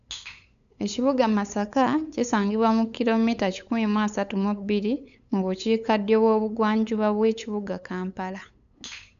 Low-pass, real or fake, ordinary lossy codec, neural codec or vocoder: 7.2 kHz; fake; none; codec, 16 kHz, 8 kbps, FunCodec, trained on LibriTTS, 25 frames a second